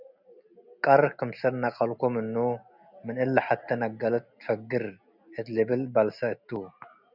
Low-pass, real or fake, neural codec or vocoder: 5.4 kHz; real; none